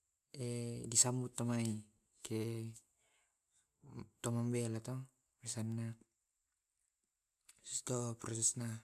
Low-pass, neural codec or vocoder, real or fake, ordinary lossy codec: 14.4 kHz; none; real; none